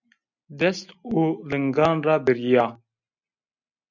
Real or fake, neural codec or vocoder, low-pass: real; none; 7.2 kHz